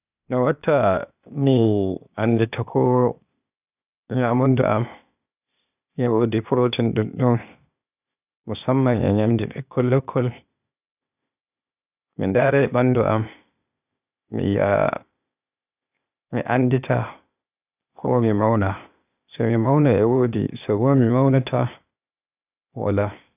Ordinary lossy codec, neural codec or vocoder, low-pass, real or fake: none; codec, 16 kHz, 0.8 kbps, ZipCodec; 3.6 kHz; fake